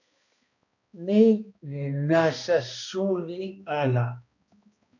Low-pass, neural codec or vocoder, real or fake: 7.2 kHz; codec, 16 kHz, 1 kbps, X-Codec, HuBERT features, trained on balanced general audio; fake